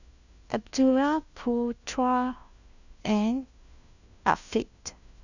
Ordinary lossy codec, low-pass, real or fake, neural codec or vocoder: none; 7.2 kHz; fake; codec, 16 kHz, 1 kbps, FunCodec, trained on LibriTTS, 50 frames a second